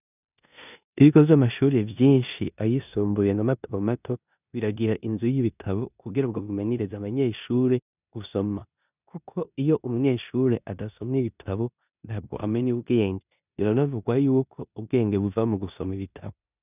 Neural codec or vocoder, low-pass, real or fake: codec, 16 kHz in and 24 kHz out, 0.9 kbps, LongCat-Audio-Codec, four codebook decoder; 3.6 kHz; fake